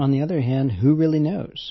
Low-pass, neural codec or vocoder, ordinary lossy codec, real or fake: 7.2 kHz; none; MP3, 24 kbps; real